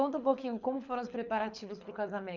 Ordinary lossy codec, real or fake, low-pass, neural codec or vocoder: none; fake; 7.2 kHz; codec, 24 kHz, 6 kbps, HILCodec